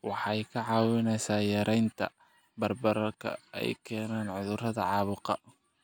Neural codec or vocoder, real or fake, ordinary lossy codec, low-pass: none; real; none; none